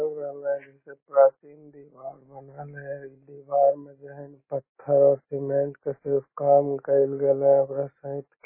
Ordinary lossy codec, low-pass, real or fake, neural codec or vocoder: MP3, 16 kbps; 3.6 kHz; real; none